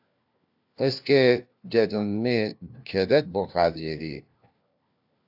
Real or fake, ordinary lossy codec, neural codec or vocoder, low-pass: fake; AAC, 48 kbps; codec, 16 kHz, 1 kbps, FunCodec, trained on LibriTTS, 50 frames a second; 5.4 kHz